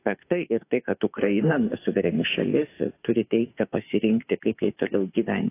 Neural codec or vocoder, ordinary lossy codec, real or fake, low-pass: autoencoder, 48 kHz, 32 numbers a frame, DAC-VAE, trained on Japanese speech; AAC, 24 kbps; fake; 3.6 kHz